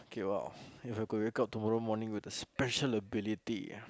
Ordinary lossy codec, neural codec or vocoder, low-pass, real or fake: none; none; none; real